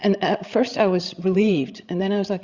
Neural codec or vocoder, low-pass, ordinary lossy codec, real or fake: codec, 16 kHz, 16 kbps, FreqCodec, larger model; 7.2 kHz; Opus, 64 kbps; fake